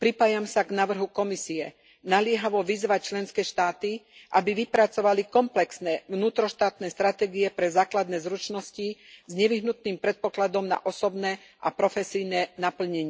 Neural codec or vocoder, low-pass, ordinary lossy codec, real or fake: none; none; none; real